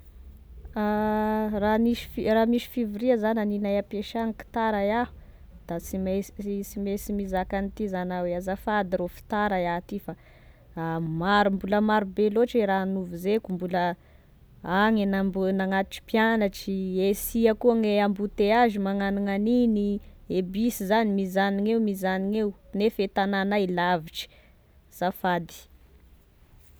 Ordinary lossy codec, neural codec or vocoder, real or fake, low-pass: none; none; real; none